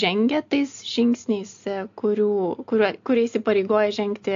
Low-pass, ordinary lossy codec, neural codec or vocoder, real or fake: 7.2 kHz; AAC, 48 kbps; none; real